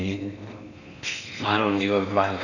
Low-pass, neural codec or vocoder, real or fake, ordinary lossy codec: 7.2 kHz; codec, 16 kHz in and 24 kHz out, 0.6 kbps, FocalCodec, streaming, 4096 codes; fake; none